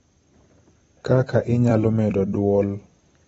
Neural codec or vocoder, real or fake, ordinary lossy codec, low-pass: vocoder, 44.1 kHz, 128 mel bands every 256 samples, BigVGAN v2; fake; AAC, 24 kbps; 19.8 kHz